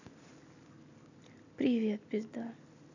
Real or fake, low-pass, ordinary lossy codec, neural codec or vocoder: real; 7.2 kHz; none; none